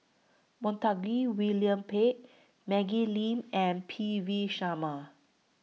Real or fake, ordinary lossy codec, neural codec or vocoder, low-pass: real; none; none; none